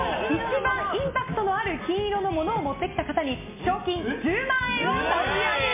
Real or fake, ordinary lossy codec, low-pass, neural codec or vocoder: real; MP3, 24 kbps; 3.6 kHz; none